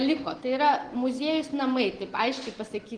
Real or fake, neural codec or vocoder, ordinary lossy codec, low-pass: real; none; Opus, 16 kbps; 9.9 kHz